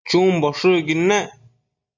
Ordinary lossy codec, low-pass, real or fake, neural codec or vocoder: MP3, 64 kbps; 7.2 kHz; real; none